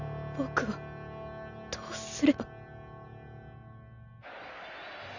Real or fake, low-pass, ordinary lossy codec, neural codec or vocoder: real; 7.2 kHz; none; none